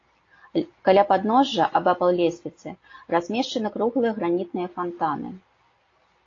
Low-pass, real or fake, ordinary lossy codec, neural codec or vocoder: 7.2 kHz; real; AAC, 32 kbps; none